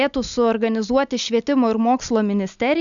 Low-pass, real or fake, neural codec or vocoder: 7.2 kHz; real; none